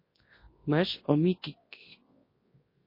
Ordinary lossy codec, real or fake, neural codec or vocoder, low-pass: MP3, 24 kbps; fake; codec, 24 kHz, 0.9 kbps, WavTokenizer, large speech release; 5.4 kHz